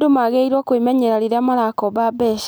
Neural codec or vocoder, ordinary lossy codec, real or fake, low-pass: none; none; real; none